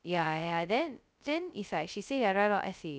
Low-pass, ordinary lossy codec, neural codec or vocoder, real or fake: none; none; codec, 16 kHz, 0.2 kbps, FocalCodec; fake